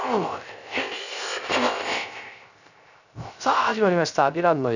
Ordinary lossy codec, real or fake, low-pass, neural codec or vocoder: none; fake; 7.2 kHz; codec, 16 kHz, 0.3 kbps, FocalCodec